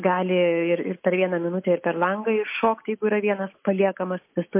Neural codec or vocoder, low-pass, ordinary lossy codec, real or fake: none; 3.6 kHz; MP3, 24 kbps; real